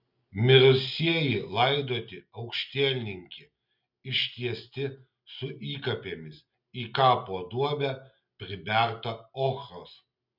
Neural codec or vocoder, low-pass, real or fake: none; 5.4 kHz; real